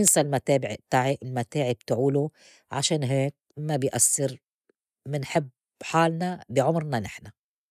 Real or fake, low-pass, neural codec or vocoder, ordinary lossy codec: real; 14.4 kHz; none; none